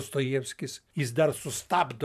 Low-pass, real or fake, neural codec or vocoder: 14.4 kHz; fake; vocoder, 44.1 kHz, 128 mel bands every 512 samples, BigVGAN v2